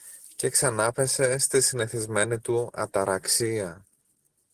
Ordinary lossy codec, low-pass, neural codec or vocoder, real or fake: Opus, 16 kbps; 14.4 kHz; none; real